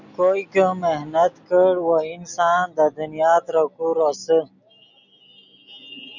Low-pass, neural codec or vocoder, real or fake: 7.2 kHz; none; real